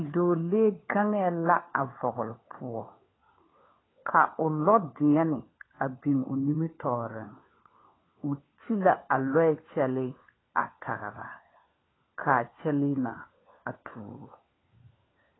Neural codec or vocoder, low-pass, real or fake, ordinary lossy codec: codec, 16 kHz, 4 kbps, FunCodec, trained on LibriTTS, 50 frames a second; 7.2 kHz; fake; AAC, 16 kbps